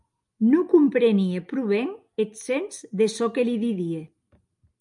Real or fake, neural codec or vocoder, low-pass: real; none; 10.8 kHz